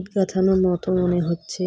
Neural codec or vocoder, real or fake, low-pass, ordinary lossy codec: none; real; none; none